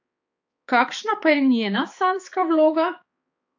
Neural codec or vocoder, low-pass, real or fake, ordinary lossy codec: codec, 16 kHz, 4 kbps, X-Codec, HuBERT features, trained on balanced general audio; 7.2 kHz; fake; AAC, 48 kbps